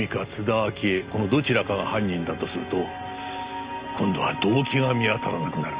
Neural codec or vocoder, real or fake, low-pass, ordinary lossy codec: none; real; 3.6 kHz; Opus, 64 kbps